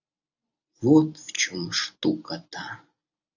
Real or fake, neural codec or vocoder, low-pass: real; none; 7.2 kHz